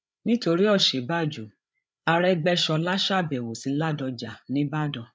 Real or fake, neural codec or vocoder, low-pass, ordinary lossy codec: fake; codec, 16 kHz, 8 kbps, FreqCodec, larger model; none; none